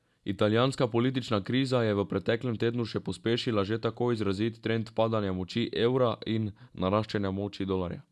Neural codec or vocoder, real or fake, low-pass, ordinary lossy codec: none; real; none; none